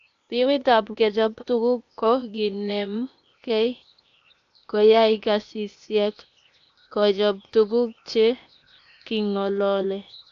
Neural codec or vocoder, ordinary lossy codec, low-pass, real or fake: codec, 16 kHz, 0.8 kbps, ZipCodec; AAC, 64 kbps; 7.2 kHz; fake